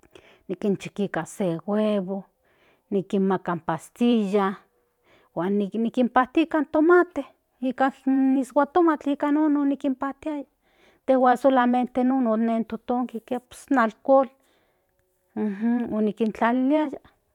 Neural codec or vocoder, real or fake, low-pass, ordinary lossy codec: vocoder, 44.1 kHz, 128 mel bands every 512 samples, BigVGAN v2; fake; 19.8 kHz; none